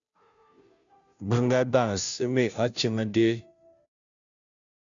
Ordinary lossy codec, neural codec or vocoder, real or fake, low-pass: MP3, 96 kbps; codec, 16 kHz, 0.5 kbps, FunCodec, trained on Chinese and English, 25 frames a second; fake; 7.2 kHz